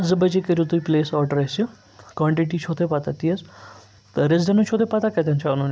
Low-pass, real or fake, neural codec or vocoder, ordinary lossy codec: none; real; none; none